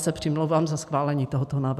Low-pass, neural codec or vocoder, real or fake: 14.4 kHz; none; real